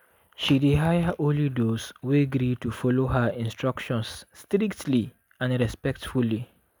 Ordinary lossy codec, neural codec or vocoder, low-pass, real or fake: none; none; none; real